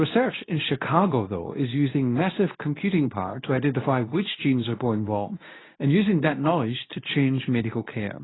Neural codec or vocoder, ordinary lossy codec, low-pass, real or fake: codec, 24 kHz, 0.9 kbps, WavTokenizer, medium speech release version 2; AAC, 16 kbps; 7.2 kHz; fake